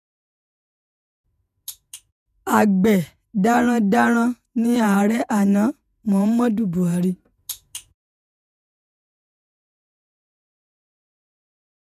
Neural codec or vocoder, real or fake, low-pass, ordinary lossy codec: vocoder, 48 kHz, 128 mel bands, Vocos; fake; 14.4 kHz; none